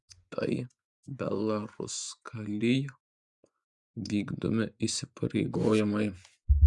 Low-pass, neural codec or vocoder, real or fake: 10.8 kHz; vocoder, 24 kHz, 100 mel bands, Vocos; fake